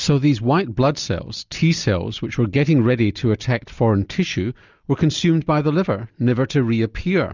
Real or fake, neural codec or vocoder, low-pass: real; none; 7.2 kHz